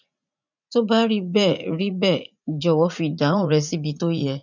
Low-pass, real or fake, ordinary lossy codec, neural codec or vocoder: 7.2 kHz; fake; none; vocoder, 44.1 kHz, 80 mel bands, Vocos